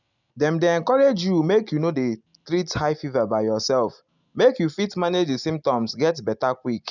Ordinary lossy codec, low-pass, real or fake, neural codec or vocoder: none; 7.2 kHz; real; none